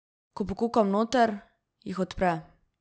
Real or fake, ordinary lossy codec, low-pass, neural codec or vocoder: real; none; none; none